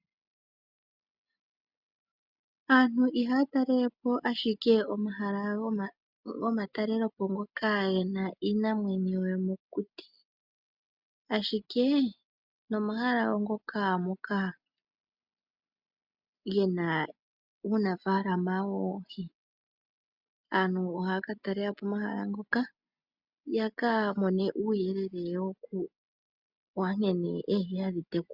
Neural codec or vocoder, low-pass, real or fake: none; 5.4 kHz; real